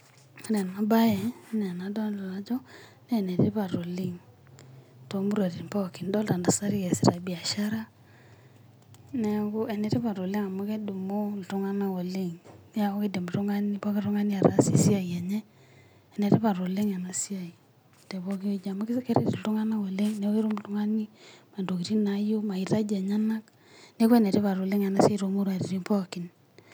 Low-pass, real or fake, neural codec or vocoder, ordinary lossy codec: none; real; none; none